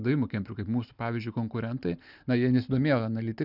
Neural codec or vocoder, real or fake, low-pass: none; real; 5.4 kHz